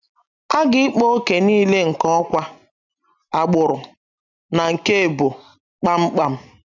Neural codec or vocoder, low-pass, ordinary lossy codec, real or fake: none; 7.2 kHz; none; real